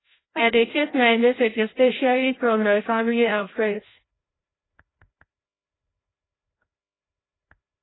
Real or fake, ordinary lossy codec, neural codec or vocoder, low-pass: fake; AAC, 16 kbps; codec, 16 kHz, 0.5 kbps, FreqCodec, larger model; 7.2 kHz